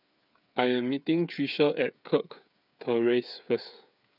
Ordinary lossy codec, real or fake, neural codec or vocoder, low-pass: none; fake; codec, 16 kHz, 8 kbps, FreqCodec, smaller model; 5.4 kHz